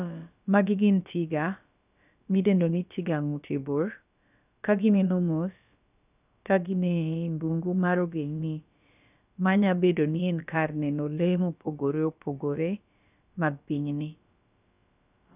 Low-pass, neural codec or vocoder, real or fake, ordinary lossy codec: 3.6 kHz; codec, 16 kHz, about 1 kbps, DyCAST, with the encoder's durations; fake; none